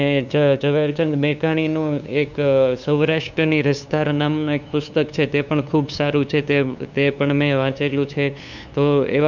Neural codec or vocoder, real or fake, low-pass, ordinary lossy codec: codec, 16 kHz, 2 kbps, FunCodec, trained on LibriTTS, 25 frames a second; fake; 7.2 kHz; none